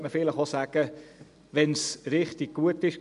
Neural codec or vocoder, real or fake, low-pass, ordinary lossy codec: none; real; 10.8 kHz; none